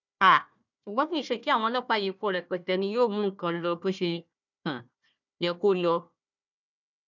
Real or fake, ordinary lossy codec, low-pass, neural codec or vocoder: fake; none; 7.2 kHz; codec, 16 kHz, 1 kbps, FunCodec, trained on Chinese and English, 50 frames a second